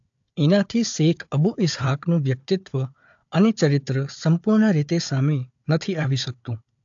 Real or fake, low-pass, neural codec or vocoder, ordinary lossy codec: fake; 7.2 kHz; codec, 16 kHz, 4 kbps, FunCodec, trained on Chinese and English, 50 frames a second; AAC, 64 kbps